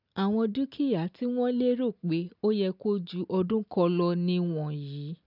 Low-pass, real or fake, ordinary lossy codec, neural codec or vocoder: 5.4 kHz; real; none; none